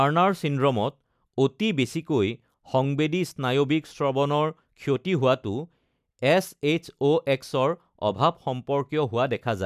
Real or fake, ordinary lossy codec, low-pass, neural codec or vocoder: real; none; 14.4 kHz; none